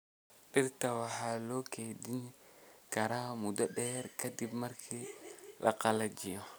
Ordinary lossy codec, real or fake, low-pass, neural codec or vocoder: none; real; none; none